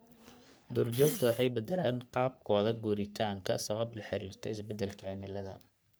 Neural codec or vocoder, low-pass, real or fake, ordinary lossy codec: codec, 44.1 kHz, 3.4 kbps, Pupu-Codec; none; fake; none